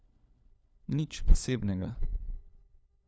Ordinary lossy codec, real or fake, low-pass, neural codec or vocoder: none; fake; none; codec, 16 kHz, 4 kbps, FunCodec, trained on LibriTTS, 50 frames a second